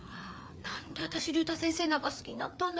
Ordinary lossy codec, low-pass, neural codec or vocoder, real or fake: none; none; codec, 16 kHz, 4 kbps, FreqCodec, larger model; fake